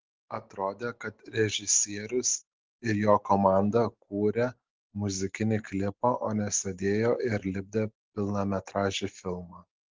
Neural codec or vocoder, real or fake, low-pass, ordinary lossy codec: none; real; 7.2 kHz; Opus, 16 kbps